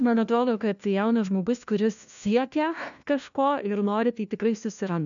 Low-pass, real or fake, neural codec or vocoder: 7.2 kHz; fake; codec, 16 kHz, 1 kbps, FunCodec, trained on LibriTTS, 50 frames a second